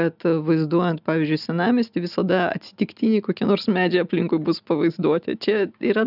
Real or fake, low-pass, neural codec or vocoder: real; 5.4 kHz; none